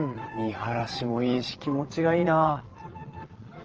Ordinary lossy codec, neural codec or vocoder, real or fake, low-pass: Opus, 16 kbps; vocoder, 22.05 kHz, 80 mel bands, Vocos; fake; 7.2 kHz